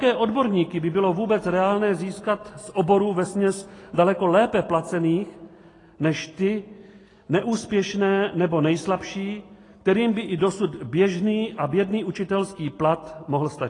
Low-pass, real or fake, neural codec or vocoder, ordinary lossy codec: 10.8 kHz; real; none; AAC, 32 kbps